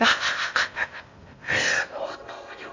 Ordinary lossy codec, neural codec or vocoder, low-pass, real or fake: MP3, 48 kbps; codec, 16 kHz in and 24 kHz out, 0.6 kbps, FocalCodec, streaming, 2048 codes; 7.2 kHz; fake